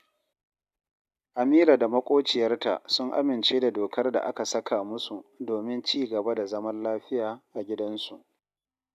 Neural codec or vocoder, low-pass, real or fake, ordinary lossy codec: none; 14.4 kHz; real; none